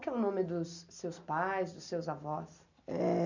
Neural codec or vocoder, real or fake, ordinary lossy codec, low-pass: none; real; none; 7.2 kHz